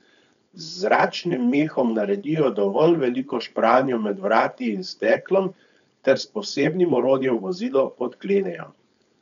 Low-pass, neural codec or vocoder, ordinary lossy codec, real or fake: 7.2 kHz; codec, 16 kHz, 4.8 kbps, FACodec; none; fake